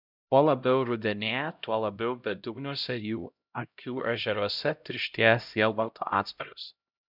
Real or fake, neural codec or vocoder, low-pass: fake; codec, 16 kHz, 0.5 kbps, X-Codec, HuBERT features, trained on LibriSpeech; 5.4 kHz